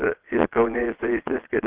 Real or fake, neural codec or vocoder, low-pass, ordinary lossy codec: fake; vocoder, 22.05 kHz, 80 mel bands, Vocos; 3.6 kHz; Opus, 16 kbps